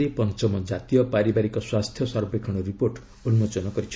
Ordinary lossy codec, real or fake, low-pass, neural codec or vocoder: none; real; none; none